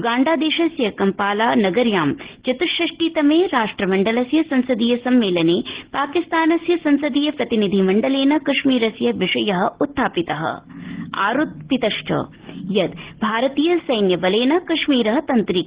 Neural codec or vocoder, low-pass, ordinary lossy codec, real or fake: none; 3.6 kHz; Opus, 16 kbps; real